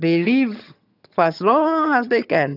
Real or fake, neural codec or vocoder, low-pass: fake; vocoder, 22.05 kHz, 80 mel bands, HiFi-GAN; 5.4 kHz